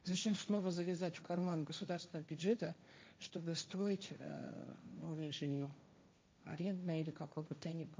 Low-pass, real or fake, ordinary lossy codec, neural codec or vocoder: none; fake; none; codec, 16 kHz, 1.1 kbps, Voila-Tokenizer